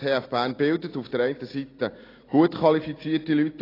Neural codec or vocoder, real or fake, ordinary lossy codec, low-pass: none; real; AAC, 24 kbps; 5.4 kHz